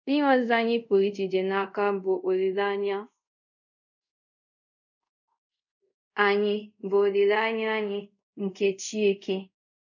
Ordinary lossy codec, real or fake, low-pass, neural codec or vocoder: none; fake; 7.2 kHz; codec, 24 kHz, 0.5 kbps, DualCodec